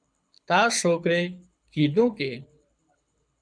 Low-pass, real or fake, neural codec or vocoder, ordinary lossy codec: 9.9 kHz; fake; codec, 24 kHz, 6 kbps, HILCodec; MP3, 96 kbps